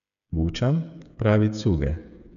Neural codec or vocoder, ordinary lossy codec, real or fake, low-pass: codec, 16 kHz, 8 kbps, FreqCodec, smaller model; none; fake; 7.2 kHz